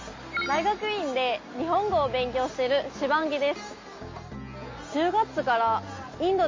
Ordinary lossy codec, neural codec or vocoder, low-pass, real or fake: MP3, 32 kbps; none; 7.2 kHz; real